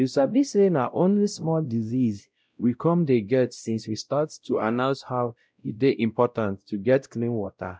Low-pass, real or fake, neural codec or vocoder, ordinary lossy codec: none; fake; codec, 16 kHz, 0.5 kbps, X-Codec, WavLM features, trained on Multilingual LibriSpeech; none